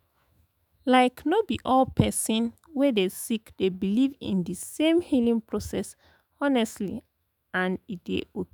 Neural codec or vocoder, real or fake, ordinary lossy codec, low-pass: autoencoder, 48 kHz, 128 numbers a frame, DAC-VAE, trained on Japanese speech; fake; none; none